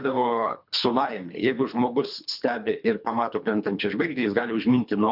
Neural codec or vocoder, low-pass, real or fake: codec, 24 kHz, 3 kbps, HILCodec; 5.4 kHz; fake